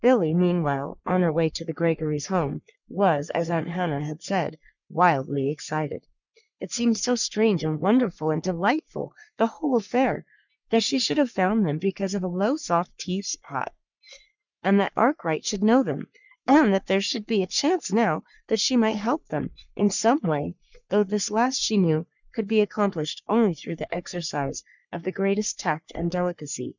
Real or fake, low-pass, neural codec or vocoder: fake; 7.2 kHz; codec, 44.1 kHz, 3.4 kbps, Pupu-Codec